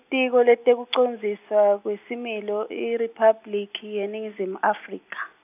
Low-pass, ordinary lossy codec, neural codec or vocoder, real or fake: 3.6 kHz; none; none; real